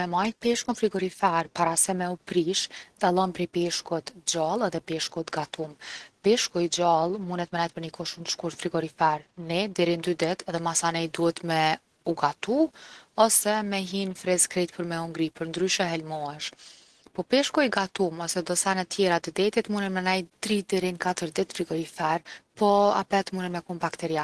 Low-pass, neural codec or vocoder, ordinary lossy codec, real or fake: 10.8 kHz; none; Opus, 16 kbps; real